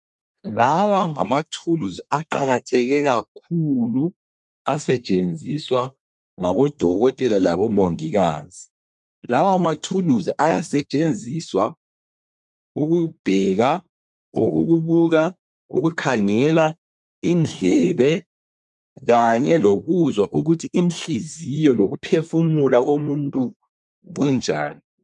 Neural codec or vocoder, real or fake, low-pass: codec, 24 kHz, 1 kbps, SNAC; fake; 10.8 kHz